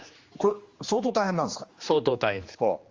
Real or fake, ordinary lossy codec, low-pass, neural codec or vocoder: fake; Opus, 32 kbps; 7.2 kHz; codec, 16 kHz, 2 kbps, X-Codec, HuBERT features, trained on general audio